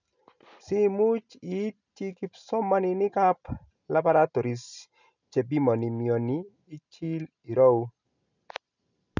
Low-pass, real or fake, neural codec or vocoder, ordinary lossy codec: 7.2 kHz; real; none; none